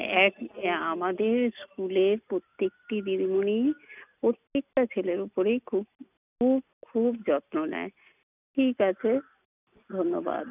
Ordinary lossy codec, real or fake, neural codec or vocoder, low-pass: none; real; none; 3.6 kHz